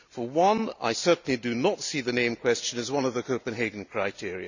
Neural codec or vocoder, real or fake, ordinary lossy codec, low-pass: none; real; none; 7.2 kHz